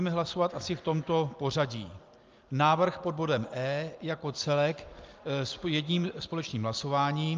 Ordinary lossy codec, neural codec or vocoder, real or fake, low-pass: Opus, 24 kbps; none; real; 7.2 kHz